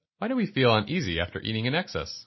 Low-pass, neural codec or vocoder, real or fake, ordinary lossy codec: 7.2 kHz; none; real; MP3, 24 kbps